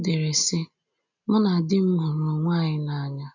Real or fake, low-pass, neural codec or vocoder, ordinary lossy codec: real; 7.2 kHz; none; none